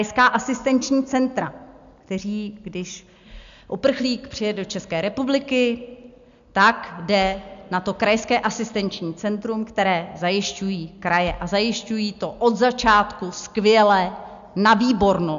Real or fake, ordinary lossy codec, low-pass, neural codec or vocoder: real; MP3, 64 kbps; 7.2 kHz; none